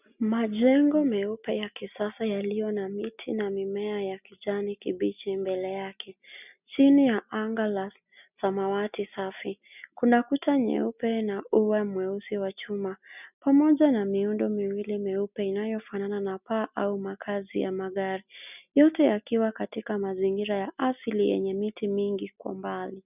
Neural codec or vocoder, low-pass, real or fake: none; 3.6 kHz; real